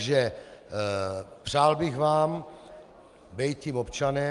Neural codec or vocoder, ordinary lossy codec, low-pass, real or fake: none; Opus, 32 kbps; 10.8 kHz; real